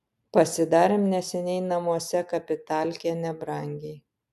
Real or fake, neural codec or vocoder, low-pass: real; none; 14.4 kHz